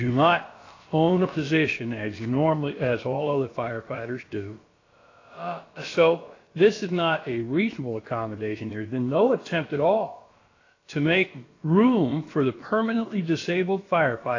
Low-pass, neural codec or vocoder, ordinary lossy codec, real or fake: 7.2 kHz; codec, 16 kHz, about 1 kbps, DyCAST, with the encoder's durations; AAC, 32 kbps; fake